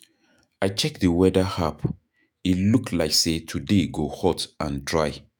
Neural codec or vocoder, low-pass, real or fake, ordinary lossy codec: autoencoder, 48 kHz, 128 numbers a frame, DAC-VAE, trained on Japanese speech; none; fake; none